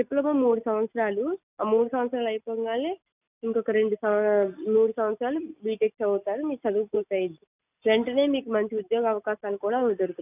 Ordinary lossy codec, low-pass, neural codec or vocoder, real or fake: none; 3.6 kHz; none; real